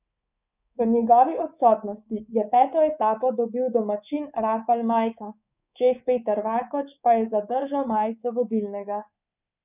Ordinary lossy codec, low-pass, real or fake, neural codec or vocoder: none; 3.6 kHz; fake; codec, 24 kHz, 3.1 kbps, DualCodec